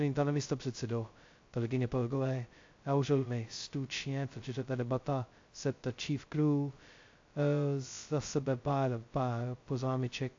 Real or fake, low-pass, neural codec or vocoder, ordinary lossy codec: fake; 7.2 kHz; codec, 16 kHz, 0.2 kbps, FocalCodec; AAC, 48 kbps